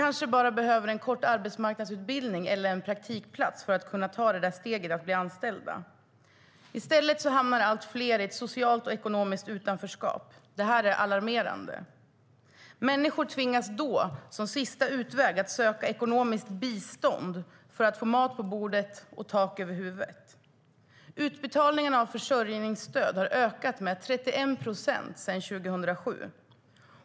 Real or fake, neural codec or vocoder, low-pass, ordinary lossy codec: real; none; none; none